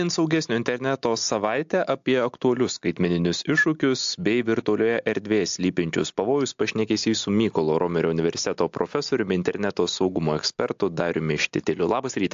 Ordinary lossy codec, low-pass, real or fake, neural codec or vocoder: AAC, 64 kbps; 7.2 kHz; real; none